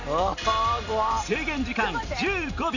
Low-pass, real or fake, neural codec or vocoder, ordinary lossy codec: 7.2 kHz; fake; vocoder, 44.1 kHz, 128 mel bands every 256 samples, BigVGAN v2; none